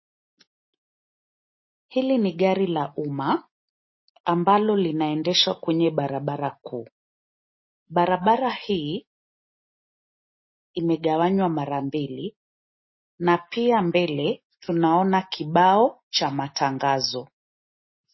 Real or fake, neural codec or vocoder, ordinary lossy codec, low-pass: real; none; MP3, 24 kbps; 7.2 kHz